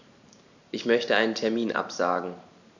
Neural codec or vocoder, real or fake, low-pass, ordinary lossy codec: vocoder, 44.1 kHz, 128 mel bands every 512 samples, BigVGAN v2; fake; 7.2 kHz; none